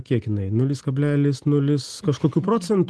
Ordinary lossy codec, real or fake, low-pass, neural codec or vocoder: Opus, 16 kbps; real; 10.8 kHz; none